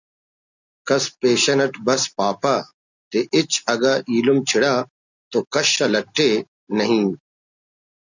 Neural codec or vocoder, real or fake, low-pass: none; real; 7.2 kHz